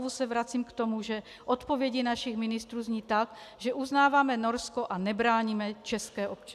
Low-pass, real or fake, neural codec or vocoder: 14.4 kHz; real; none